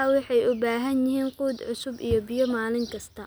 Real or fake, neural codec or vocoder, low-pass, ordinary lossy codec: real; none; none; none